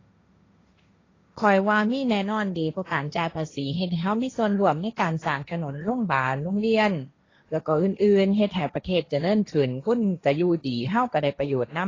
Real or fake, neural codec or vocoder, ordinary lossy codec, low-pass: fake; codec, 16 kHz, 1.1 kbps, Voila-Tokenizer; AAC, 32 kbps; 7.2 kHz